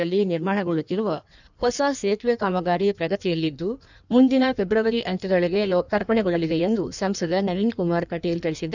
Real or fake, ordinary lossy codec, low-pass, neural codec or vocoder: fake; none; 7.2 kHz; codec, 16 kHz in and 24 kHz out, 1.1 kbps, FireRedTTS-2 codec